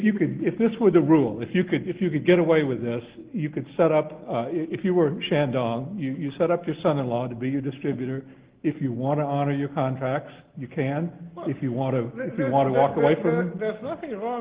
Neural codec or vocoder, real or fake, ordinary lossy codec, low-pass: none; real; Opus, 16 kbps; 3.6 kHz